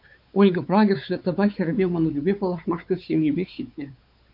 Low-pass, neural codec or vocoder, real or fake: 5.4 kHz; codec, 16 kHz, 4 kbps, X-Codec, WavLM features, trained on Multilingual LibriSpeech; fake